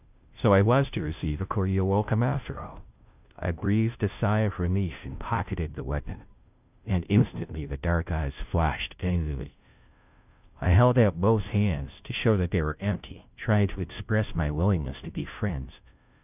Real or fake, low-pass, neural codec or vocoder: fake; 3.6 kHz; codec, 16 kHz, 0.5 kbps, FunCodec, trained on Chinese and English, 25 frames a second